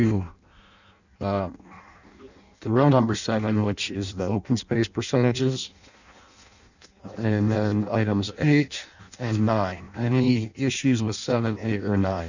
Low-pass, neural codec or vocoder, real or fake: 7.2 kHz; codec, 16 kHz in and 24 kHz out, 0.6 kbps, FireRedTTS-2 codec; fake